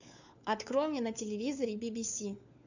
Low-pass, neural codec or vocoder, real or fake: 7.2 kHz; codec, 16 kHz, 4 kbps, FunCodec, trained on LibriTTS, 50 frames a second; fake